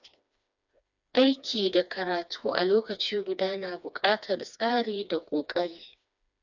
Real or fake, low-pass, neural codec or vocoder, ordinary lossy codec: fake; 7.2 kHz; codec, 16 kHz, 2 kbps, FreqCodec, smaller model; none